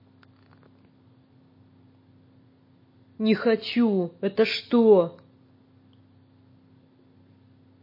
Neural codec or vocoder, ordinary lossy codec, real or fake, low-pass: none; MP3, 24 kbps; real; 5.4 kHz